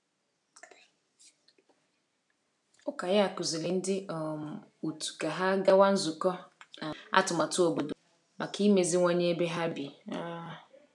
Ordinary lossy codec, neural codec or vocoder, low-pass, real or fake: none; none; 10.8 kHz; real